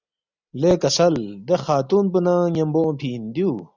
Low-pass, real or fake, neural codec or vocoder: 7.2 kHz; real; none